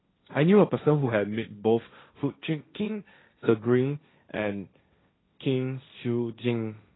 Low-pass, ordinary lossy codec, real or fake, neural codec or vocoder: 7.2 kHz; AAC, 16 kbps; fake; codec, 16 kHz, 1.1 kbps, Voila-Tokenizer